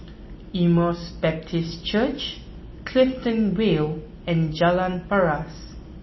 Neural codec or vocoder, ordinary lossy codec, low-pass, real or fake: none; MP3, 24 kbps; 7.2 kHz; real